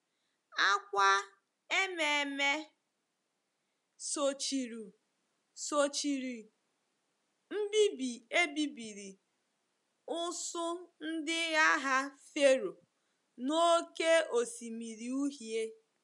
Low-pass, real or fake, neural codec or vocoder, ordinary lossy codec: 10.8 kHz; real; none; none